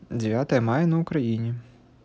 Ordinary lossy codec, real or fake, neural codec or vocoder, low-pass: none; real; none; none